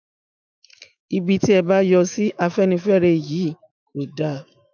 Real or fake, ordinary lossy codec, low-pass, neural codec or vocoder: fake; none; 7.2 kHz; autoencoder, 48 kHz, 128 numbers a frame, DAC-VAE, trained on Japanese speech